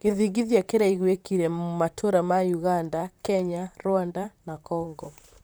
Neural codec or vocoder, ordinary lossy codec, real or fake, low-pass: vocoder, 44.1 kHz, 128 mel bands, Pupu-Vocoder; none; fake; none